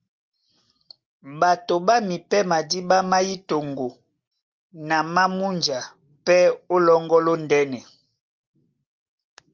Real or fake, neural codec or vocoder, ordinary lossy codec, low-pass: real; none; Opus, 32 kbps; 7.2 kHz